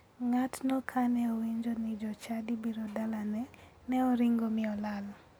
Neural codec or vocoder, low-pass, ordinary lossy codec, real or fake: none; none; none; real